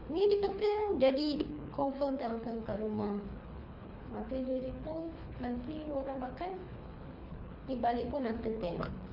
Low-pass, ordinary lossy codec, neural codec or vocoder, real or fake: 5.4 kHz; none; codec, 24 kHz, 3 kbps, HILCodec; fake